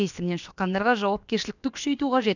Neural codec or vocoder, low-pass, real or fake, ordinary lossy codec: codec, 16 kHz, about 1 kbps, DyCAST, with the encoder's durations; 7.2 kHz; fake; none